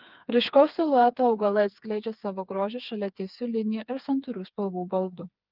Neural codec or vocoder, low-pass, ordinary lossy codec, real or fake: codec, 16 kHz, 4 kbps, FreqCodec, smaller model; 5.4 kHz; Opus, 24 kbps; fake